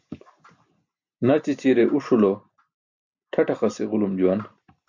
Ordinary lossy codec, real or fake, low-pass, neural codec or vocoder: MP3, 48 kbps; real; 7.2 kHz; none